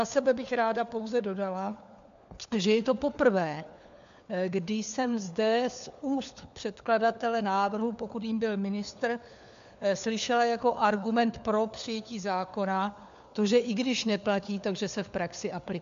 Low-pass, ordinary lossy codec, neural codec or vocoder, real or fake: 7.2 kHz; MP3, 64 kbps; codec, 16 kHz, 4 kbps, FunCodec, trained on LibriTTS, 50 frames a second; fake